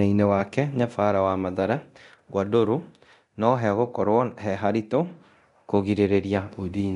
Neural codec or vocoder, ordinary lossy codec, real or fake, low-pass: codec, 24 kHz, 0.9 kbps, DualCodec; MP3, 64 kbps; fake; 10.8 kHz